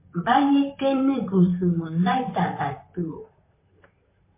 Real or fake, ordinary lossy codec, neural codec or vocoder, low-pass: fake; MP3, 24 kbps; vocoder, 44.1 kHz, 128 mel bands, Pupu-Vocoder; 3.6 kHz